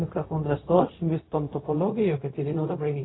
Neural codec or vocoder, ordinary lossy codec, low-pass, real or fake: codec, 16 kHz, 0.4 kbps, LongCat-Audio-Codec; AAC, 16 kbps; 7.2 kHz; fake